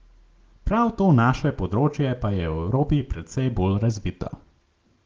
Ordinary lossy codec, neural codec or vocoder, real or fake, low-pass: Opus, 16 kbps; none; real; 7.2 kHz